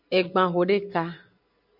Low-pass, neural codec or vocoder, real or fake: 5.4 kHz; none; real